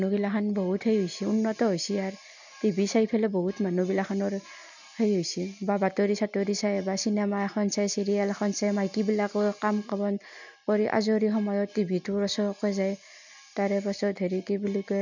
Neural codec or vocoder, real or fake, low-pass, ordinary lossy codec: none; real; 7.2 kHz; none